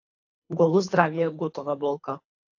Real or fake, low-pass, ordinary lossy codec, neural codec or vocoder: fake; 7.2 kHz; AAC, 48 kbps; codec, 24 kHz, 3 kbps, HILCodec